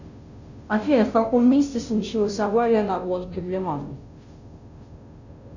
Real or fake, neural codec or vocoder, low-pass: fake; codec, 16 kHz, 0.5 kbps, FunCodec, trained on Chinese and English, 25 frames a second; 7.2 kHz